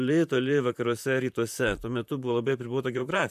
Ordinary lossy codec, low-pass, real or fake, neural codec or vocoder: MP3, 96 kbps; 14.4 kHz; fake; vocoder, 44.1 kHz, 128 mel bands, Pupu-Vocoder